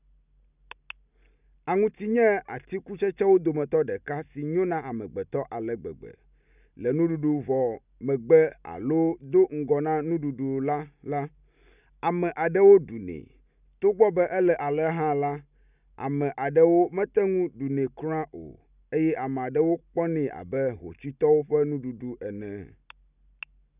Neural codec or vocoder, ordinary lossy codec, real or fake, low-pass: none; none; real; 3.6 kHz